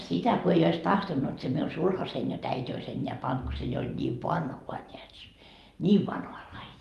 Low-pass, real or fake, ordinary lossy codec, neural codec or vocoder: 14.4 kHz; fake; Opus, 32 kbps; vocoder, 44.1 kHz, 128 mel bands every 512 samples, BigVGAN v2